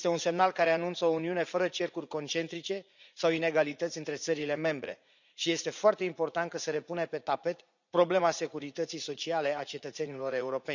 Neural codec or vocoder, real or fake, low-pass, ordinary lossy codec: vocoder, 44.1 kHz, 80 mel bands, Vocos; fake; 7.2 kHz; none